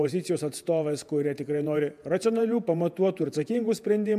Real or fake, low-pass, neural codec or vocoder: fake; 14.4 kHz; vocoder, 48 kHz, 128 mel bands, Vocos